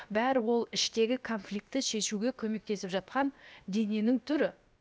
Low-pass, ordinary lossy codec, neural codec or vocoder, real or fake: none; none; codec, 16 kHz, about 1 kbps, DyCAST, with the encoder's durations; fake